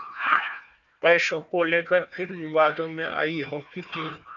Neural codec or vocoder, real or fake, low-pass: codec, 16 kHz, 1 kbps, FunCodec, trained on Chinese and English, 50 frames a second; fake; 7.2 kHz